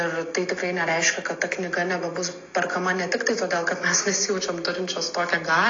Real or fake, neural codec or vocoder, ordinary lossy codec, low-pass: real; none; AAC, 32 kbps; 7.2 kHz